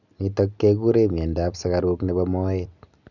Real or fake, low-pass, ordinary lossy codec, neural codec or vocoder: real; 7.2 kHz; none; none